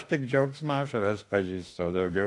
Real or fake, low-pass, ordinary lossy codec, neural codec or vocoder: fake; 10.8 kHz; AAC, 48 kbps; autoencoder, 48 kHz, 32 numbers a frame, DAC-VAE, trained on Japanese speech